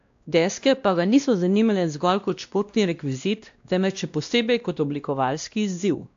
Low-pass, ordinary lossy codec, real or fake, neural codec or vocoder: 7.2 kHz; AAC, 64 kbps; fake; codec, 16 kHz, 1 kbps, X-Codec, WavLM features, trained on Multilingual LibriSpeech